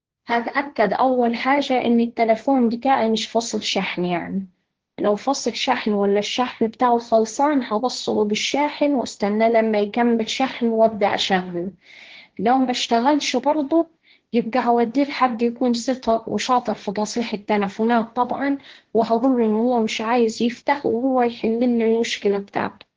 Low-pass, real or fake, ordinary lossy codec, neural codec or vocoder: 7.2 kHz; fake; Opus, 16 kbps; codec, 16 kHz, 1.1 kbps, Voila-Tokenizer